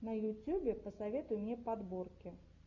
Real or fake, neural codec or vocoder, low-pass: real; none; 7.2 kHz